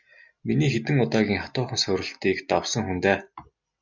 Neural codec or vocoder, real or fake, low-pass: none; real; 7.2 kHz